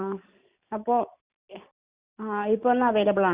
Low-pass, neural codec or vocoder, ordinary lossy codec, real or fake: 3.6 kHz; none; Opus, 64 kbps; real